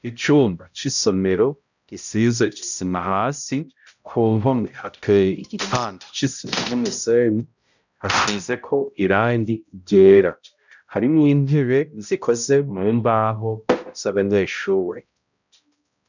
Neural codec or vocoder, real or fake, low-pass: codec, 16 kHz, 0.5 kbps, X-Codec, HuBERT features, trained on balanced general audio; fake; 7.2 kHz